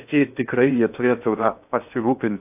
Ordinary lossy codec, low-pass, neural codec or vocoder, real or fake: AAC, 32 kbps; 3.6 kHz; codec, 16 kHz in and 24 kHz out, 0.8 kbps, FocalCodec, streaming, 65536 codes; fake